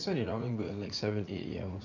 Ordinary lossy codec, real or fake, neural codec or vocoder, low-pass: none; fake; vocoder, 44.1 kHz, 80 mel bands, Vocos; 7.2 kHz